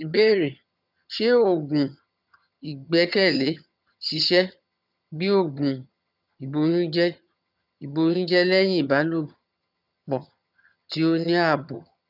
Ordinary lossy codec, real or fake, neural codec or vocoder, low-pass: none; fake; vocoder, 22.05 kHz, 80 mel bands, HiFi-GAN; 5.4 kHz